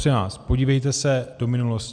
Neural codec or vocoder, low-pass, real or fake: none; 9.9 kHz; real